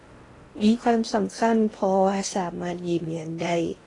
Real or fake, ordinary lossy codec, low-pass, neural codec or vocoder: fake; AAC, 32 kbps; 10.8 kHz; codec, 16 kHz in and 24 kHz out, 0.6 kbps, FocalCodec, streaming, 4096 codes